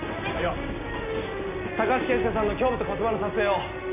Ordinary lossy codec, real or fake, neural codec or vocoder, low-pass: none; real; none; 3.6 kHz